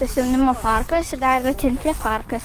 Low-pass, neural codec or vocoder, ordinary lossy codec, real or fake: 14.4 kHz; codec, 44.1 kHz, 7.8 kbps, DAC; Opus, 32 kbps; fake